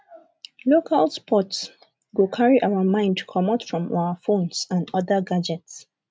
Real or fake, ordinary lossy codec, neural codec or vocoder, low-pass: real; none; none; none